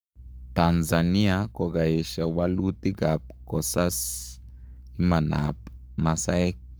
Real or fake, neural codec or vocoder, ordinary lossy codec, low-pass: fake; codec, 44.1 kHz, 7.8 kbps, Pupu-Codec; none; none